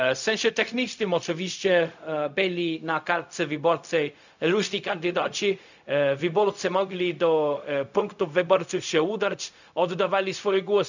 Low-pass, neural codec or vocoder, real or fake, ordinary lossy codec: 7.2 kHz; codec, 16 kHz, 0.4 kbps, LongCat-Audio-Codec; fake; none